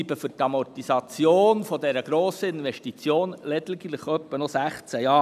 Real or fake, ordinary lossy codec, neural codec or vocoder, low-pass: real; none; none; 14.4 kHz